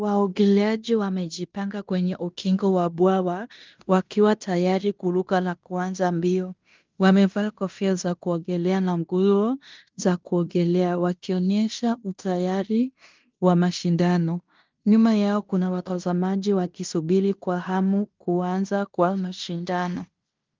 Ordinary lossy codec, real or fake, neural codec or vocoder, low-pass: Opus, 24 kbps; fake; codec, 16 kHz in and 24 kHz out, 0.9 kbps, LongCat-Audio-Codec, fine tuned four codebook decoder; 7.2 kHz